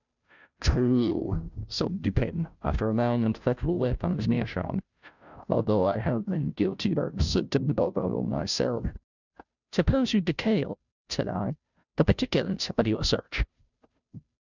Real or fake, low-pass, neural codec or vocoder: fake; 7.2 kHz; codec, 16 kHz, 0.5 kbps, FunCodec, trained on Chinese and English, 25 frames a second